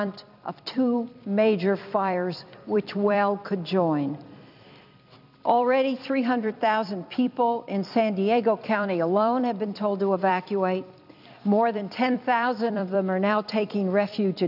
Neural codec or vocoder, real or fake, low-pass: vocoder, 44.1 kHz, 128 mel bands every 256 samples, BigVGAN v2; fake; 5.4 kHz